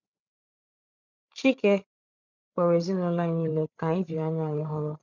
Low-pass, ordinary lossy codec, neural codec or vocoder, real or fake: 7.2 kHz; none; codec, 16 kHz, 8 kbps, FreqCodec, larger model; fake